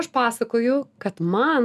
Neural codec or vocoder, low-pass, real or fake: none; 14.4 kHz; real